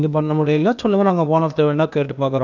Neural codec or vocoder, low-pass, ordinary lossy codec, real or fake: codec, 16 kHz, 0.8 kbps, ZipCodec; 7.2 kHz; none; fake